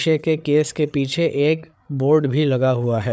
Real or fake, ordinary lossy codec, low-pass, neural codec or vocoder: fake; none; none; codec, 16 kHz, 8 kbps, FreqCodec, larger model